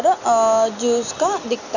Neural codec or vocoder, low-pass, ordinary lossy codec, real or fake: none; 7.2 kHz; none; real